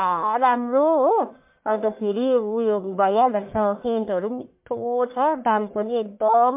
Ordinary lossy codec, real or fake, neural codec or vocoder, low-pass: MP3, 32 kbps; fake; codec, 44.1 kHz, 1.7 kbps, Pupu-Codec; 3.6 kHz